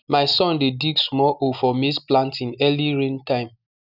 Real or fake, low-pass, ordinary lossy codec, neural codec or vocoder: real; 5.4 kHz; none; none